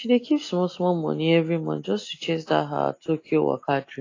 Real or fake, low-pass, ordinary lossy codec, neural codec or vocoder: real; 7.2 kHz; AAC, 32 kbps; none